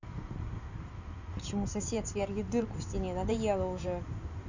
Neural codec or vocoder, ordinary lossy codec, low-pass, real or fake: codec, 16 kHz in and 24 kHz out, 2.2 kbps, FireRedTTS-2 codec; none; 7.2 kHz; fake